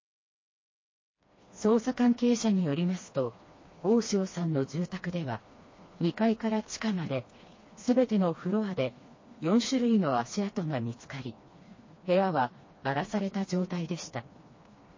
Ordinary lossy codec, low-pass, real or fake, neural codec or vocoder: MP3, 32 kbps; 7.2 kHz; fake; codec, 16 kHz, 2 kbps, FreqCodec, smaller model